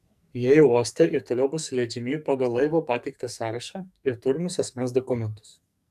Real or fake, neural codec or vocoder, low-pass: fake; codec, 44.1 kHz, 2.6 kbps, SNAC; 14.4 kHz